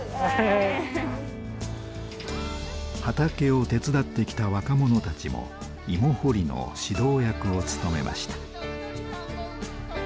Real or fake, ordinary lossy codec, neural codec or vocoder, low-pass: real; none; none; none